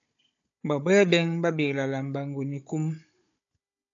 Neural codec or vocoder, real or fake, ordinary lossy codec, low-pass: codec, 16 kHz, 16 kbps, FunCodec, trained on Chinese and English, 50 frames a second; fake; AAC, 64 kbps; 7.2 kHz